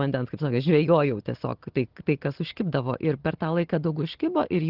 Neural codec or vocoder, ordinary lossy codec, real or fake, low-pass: none; Opus, 16 kbps; real; 5.4 kHz